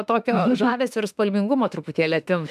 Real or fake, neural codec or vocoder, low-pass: fake; autoencoder, 48 kHz, 32 numbers a frame, DAC-VAE, trained on Japanese speech; 14.4 kHz